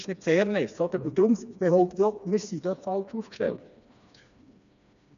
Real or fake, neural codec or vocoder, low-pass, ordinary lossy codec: fake; codec, 16 kHz, 2 kbps, FreqCodec, smaller model; 7.2 kHz; none